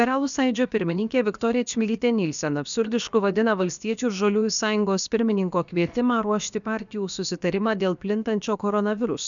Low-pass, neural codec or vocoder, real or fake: 7.2 kHz; codec, 16 kHz, about 1 kbps, DyCAST, with the encoder's durations; fake